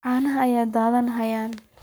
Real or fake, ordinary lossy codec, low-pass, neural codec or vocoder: fake; none; none; codec, 44.1 kHz, 7.8 kbps, Pupu-Codec